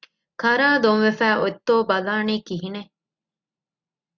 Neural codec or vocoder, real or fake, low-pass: none; real; 7.2 kHz